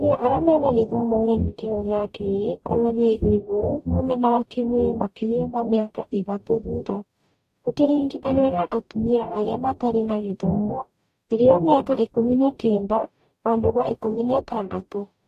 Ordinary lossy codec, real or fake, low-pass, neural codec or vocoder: AAC, 64 kbps; fake; 14.4 kHz; codec, 44.1 kHz, 0.9 kbps, DAC